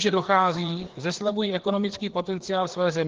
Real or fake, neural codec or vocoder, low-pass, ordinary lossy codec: fake; codec, 16 kHz, 2 kbps, FreqCodec, larger model; 7.2 kHz; Opus, 16 kbps